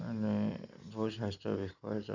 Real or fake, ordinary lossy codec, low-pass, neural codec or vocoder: real; none; 7.2 kHz; none